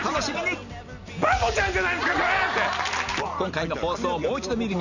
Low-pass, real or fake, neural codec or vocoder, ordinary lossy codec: 7.2 kHz; real; none; none